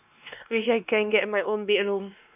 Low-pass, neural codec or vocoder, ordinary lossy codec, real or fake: 3.6 kHz; codec, 16 kHz, 2 kbps, X-Codec, WavLM features, trained on Multilingual LibriSpeech; none; fake